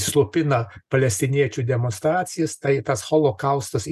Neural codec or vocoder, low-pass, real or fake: none; 14.4 kHz; real